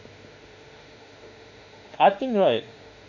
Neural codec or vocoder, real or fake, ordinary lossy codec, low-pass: autoencoder, 48 kHz, 32 numbers a frame, DAC-VAE, trained on Japanese speech; fake; none; 7.2 kHz